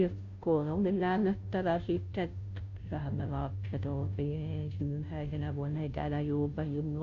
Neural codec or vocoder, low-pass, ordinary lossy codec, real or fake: codec, 16 kHz, 0.5 kbps, FunCodec, trained on Chinese and English, 25 frames a second; 7.2 kHz; AAC, 48 kbps; fake